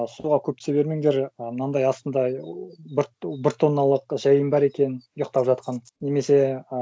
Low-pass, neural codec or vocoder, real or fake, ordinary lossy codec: none; none; real; none